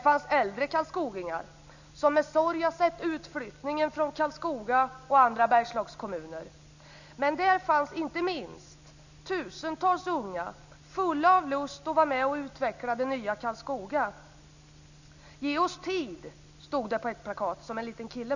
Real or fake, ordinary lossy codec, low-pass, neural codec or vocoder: real; none; 7.2 kHz; none